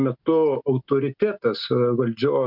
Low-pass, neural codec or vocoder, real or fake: 5.4 kHz; none; real